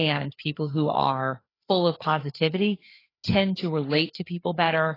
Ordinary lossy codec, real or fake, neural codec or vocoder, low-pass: AAC, 24 kbps; fake; codec, 16 kHz, 4 kbps, FreqCodec, larger model; 5.4 kHz